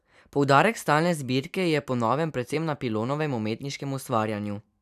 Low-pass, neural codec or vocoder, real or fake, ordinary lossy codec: 14.4 kHz; vocoder, 44.1 kHz, 128 mel bands every 256 samples, BigVGAN v2; fake; none